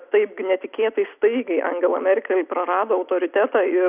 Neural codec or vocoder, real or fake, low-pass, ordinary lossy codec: none; real; 3.6 kHz; Opus, 64 kbps